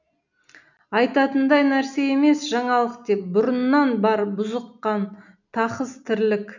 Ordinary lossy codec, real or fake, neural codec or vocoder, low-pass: none; real; none; 7.2 kHz